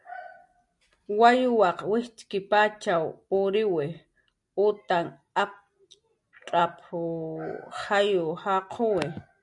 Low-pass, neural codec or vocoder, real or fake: 10.8 kHz; none; real